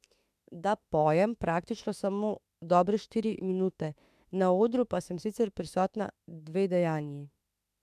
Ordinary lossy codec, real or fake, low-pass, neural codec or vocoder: MP3, 96 kbps; fake; 14.4 kHz; autoencoder, 48 kHz, 32 numbers a frame, DAC-VAE, trained on Japanese speech